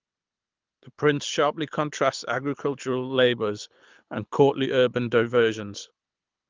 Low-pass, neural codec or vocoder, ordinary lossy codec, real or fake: 7.2 kHz; codec, 24 kHz, 6 kbps, HILCodec; Opus, 24 kbps; fake